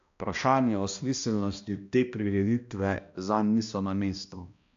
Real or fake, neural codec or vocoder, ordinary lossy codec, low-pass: fake; codec, 16 kHz, 1 kbps, X-Codec, HuBERT features, trained on balanced general audio; AAC, 64 kbps; 7.2 kHz